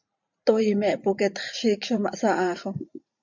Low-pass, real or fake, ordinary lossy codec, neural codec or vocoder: 7.2 kHz; fake; MP3, 64 kbps; vocoder, 44.1 kHz, 128 mel bands every 512 samples, BigVGAN v2